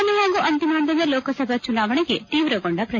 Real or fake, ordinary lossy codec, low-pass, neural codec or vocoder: real; none; none; none